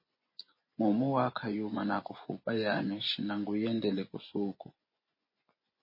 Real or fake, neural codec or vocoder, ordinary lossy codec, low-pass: fake; vocoder, 24 kHz, 100 mel bands, Vocos; MP3, 24 kbps; 5.4 kHz